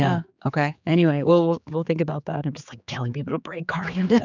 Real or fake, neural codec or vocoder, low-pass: fake; codec, 16 kHz, 4 kbps, X-Codec, HuBERT features, trained on general audio; 7.2 kHz